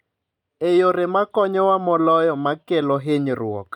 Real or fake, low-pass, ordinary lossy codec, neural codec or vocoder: real; 19.8 kHz; none; none